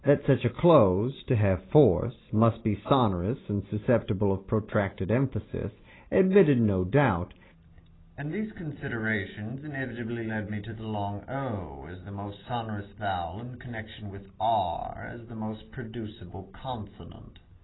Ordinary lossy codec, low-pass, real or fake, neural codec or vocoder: AAC, 16 kbps; 7.2 kHz; real; none